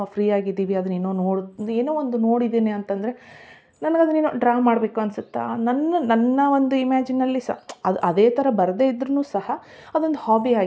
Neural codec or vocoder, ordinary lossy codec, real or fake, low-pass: none; none; real; none